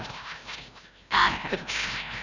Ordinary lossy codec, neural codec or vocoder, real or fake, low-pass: none; codec, 16 kHz, 0.5 kbps, FreqCodec, larger model; fake; 7.2 kHz